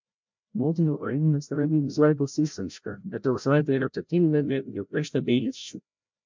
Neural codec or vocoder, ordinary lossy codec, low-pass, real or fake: codec, 16 kHz, 0.5 kbps, FreqCodec, larger model; MP3, 64 kbps; 7.2 kHz; fake